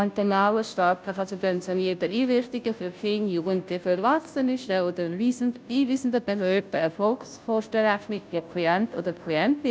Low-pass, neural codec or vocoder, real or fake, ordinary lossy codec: none; codec, 16 kHz, 0.5 kbps, FunCodec, trained on Chinese and English, 25 frames a second; fake; none